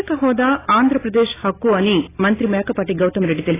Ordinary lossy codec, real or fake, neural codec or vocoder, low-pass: AAC, 16 kbps; real; none; 3.6 kHz